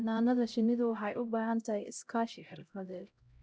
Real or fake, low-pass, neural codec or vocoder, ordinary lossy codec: fake; none; codec, 16 kHz, 0.5 kbps, X-Codec, HuBERT features, trained on LibriSpeech; none